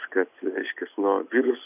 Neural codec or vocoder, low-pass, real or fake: none; 3.6 kHz; real